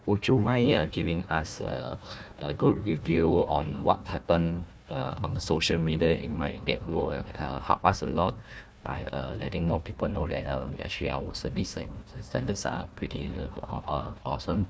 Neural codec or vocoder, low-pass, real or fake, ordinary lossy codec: codec, 16 kHz, 1 kbps, FunCodec, trained on Chinese and English, 50 frames a second; none; fake; none